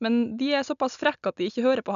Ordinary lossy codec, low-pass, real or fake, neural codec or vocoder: none; 7.2 kHz; real; none